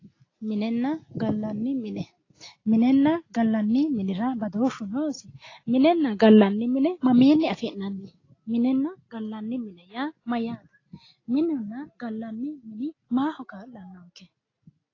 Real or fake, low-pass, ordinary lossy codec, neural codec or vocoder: real; 7.2 kHz; AAC, 32 kbps; none